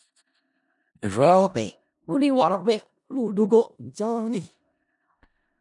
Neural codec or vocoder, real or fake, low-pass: codec, 16 kHz in and 24 kHz out, 0.4 kbps, LongCat-Audio-Codec, four codebook decoder; fake; 10.8 kHz